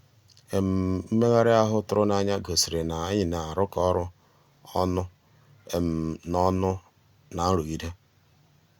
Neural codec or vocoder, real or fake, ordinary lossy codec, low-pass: none; real; Opus, 64 kbps; 19.8 kHz